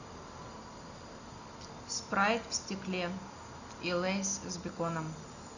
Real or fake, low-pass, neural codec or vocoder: real; 7.2 kHz; none